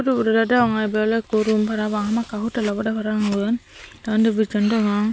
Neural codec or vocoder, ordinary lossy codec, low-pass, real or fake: none; none; none; real